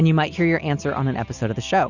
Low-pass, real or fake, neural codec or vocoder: 7.2 kHz; real; none